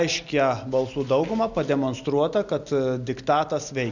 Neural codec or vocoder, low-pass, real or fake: none; 7.2 kHz; real